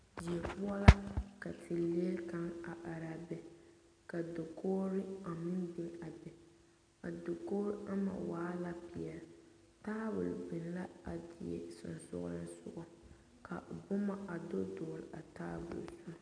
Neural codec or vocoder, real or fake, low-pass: none; real; 9.9 kHz